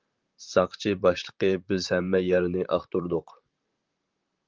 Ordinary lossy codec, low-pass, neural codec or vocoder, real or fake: Opus, 24 kbps; 7.2 kHz; vocoder, 44.1 kHz, 128 mel bands, Pupu-Vocoder; fake